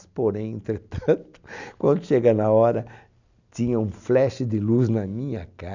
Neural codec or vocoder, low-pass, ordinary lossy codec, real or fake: none; 7.2 kHz; none; real